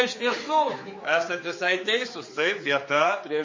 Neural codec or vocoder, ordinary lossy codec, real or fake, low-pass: codec, 16 kHz, 4 kbps, X-Codec, HuBERT features, trained on general audio; MP3, 32 kbps; fake; 7.2 kHz